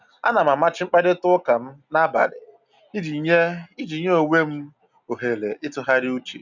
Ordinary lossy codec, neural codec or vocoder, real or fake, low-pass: none; none; real; 7.2 kHz